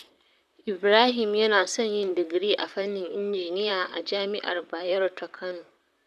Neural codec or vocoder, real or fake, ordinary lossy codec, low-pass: vocoder, 44.1 kHz, 128 mel bands, Pupu-Vocoder; fake; none; 14.4 kHz